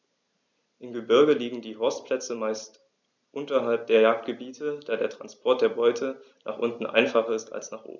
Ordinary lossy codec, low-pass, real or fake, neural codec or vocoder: none; 7.2 kHz; real; none